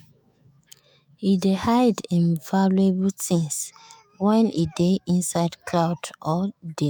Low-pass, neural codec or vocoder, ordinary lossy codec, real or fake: none; autoencoder, 48 kHz, 128 numbers a frame, DAC-VAE, trained on Japanese speech; none; fake